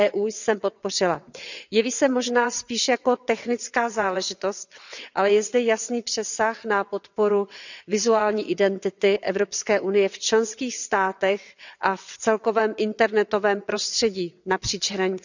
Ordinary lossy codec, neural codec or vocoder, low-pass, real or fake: none; vocoder, 22.05 kHz, 80 mel bands, WaveNeXt; 7.2 kHz; fake